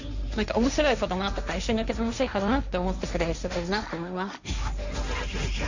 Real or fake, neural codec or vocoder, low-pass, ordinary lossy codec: fake; codec, 16 kHz, 1.1 kbps, Voila-Tokenizer; 7.2 kHz; none